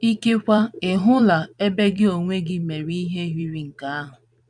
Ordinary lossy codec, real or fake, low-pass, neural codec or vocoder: none; fake; 9.9 kHz; vocoder, 44.1 kHz, 128 mel bands every 256 samples, BigVGAN v2